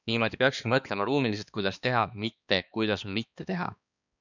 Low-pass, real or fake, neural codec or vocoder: 7.2 kHz; fake; codec, 16 kHz, 2 kbps, X-Codec, HuBERT features, trained on balanced general audio